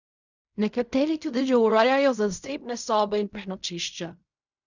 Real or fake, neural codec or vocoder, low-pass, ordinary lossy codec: fake; codec, 16 kHz in and 24 kHz out, 0.4 kbps, LongCat-Audio-Codec, fine tuned four codebook decoder; 7.2 kHz; none